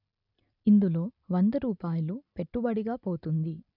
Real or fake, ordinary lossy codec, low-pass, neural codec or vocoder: real; none; 5.4 kHz; none